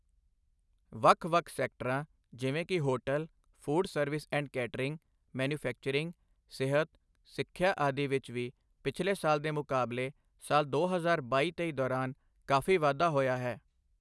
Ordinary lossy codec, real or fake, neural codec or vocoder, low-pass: none; real; none; none